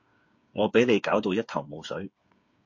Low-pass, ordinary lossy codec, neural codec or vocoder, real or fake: 7.2 kHz; MP3, 48 kbps; codec, 16 kHz, 8 kbps, FreqCodec, smaller model; fake